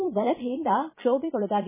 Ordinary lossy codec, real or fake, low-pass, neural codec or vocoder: MP3, 16 kbps; fake; 3.6 kHz; vocoder, 22.05 kHz, 80 mel bands, Vocos